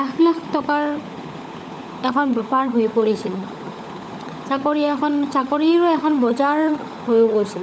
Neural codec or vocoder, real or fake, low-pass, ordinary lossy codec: codec, 16 kHz, 16 kbps, FunCodec, trained on LibriTTS, 50 frames a second; fake; none; none